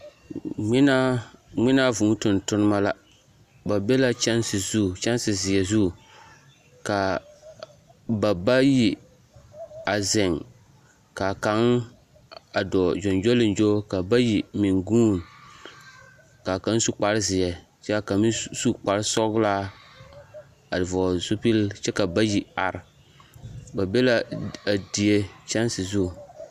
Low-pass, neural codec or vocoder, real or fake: 14.4 kHz; none; real